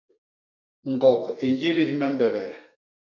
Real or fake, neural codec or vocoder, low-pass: fake; codec, 32 kHz, 1.9 kbps, SNAC; 7.2 kHz